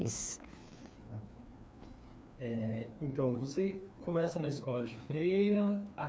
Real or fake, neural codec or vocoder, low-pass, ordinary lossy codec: fake; codec, 16 kHz, 2 kbps, FreqCodec, larger model; none; none